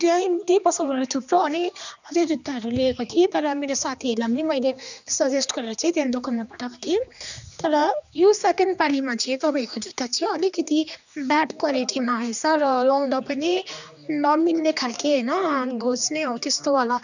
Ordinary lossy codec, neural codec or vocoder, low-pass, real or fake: none; codec, 16 kHz, 2 kbps, X-Codec, HuBERT features, trained on general audio; 7.2 kHz; fake